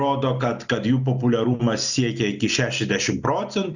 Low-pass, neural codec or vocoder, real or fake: 7.2 kHz; none; real